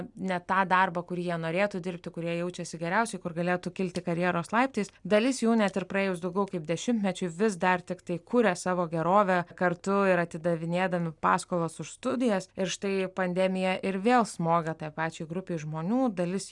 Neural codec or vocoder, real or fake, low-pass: none; real; 10.8 kHz